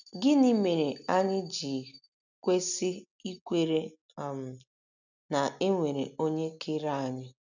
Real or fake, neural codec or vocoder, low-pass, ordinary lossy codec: real; none; 7.2 kHz; none